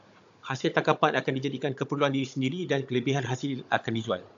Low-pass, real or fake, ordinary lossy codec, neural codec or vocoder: 7.2 kHz; fake; AAC, 64 kbps; codec, 16 kHz, 16 kbps, FunCodec, trained on Chinese and English, 50 frames a second